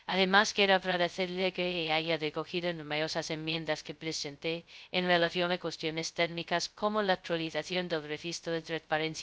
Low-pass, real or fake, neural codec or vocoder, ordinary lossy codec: none; fake; codec, 16 kHz, 0.2 kbps, FocalCodec; none